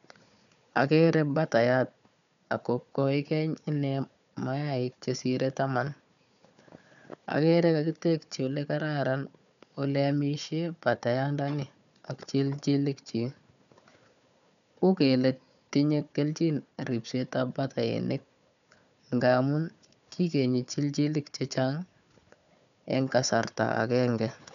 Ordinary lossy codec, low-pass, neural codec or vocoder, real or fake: none; 7.2 kHz; codec, 16 kHz, 4 kbps, FunCodec, trained on Chinese and English, 50 frames a second; fake